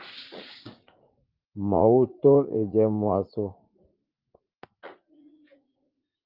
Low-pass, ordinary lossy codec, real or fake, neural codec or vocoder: 5.4 kHz; Opus, 32 kbps; fake; vocoder, 44.1 kHz, 80 mel bands, Vocos